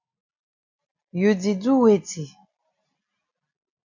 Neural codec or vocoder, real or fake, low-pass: none; real; 7.2 kHz